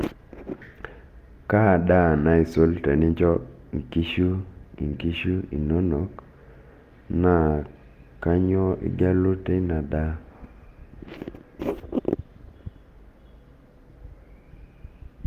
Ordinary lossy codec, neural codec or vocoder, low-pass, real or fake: Opus, 32 kbps; none; 14.4 kHz; real